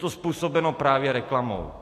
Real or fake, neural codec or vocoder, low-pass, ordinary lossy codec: real; none; 14.4 kHz; AAC, 64 kbps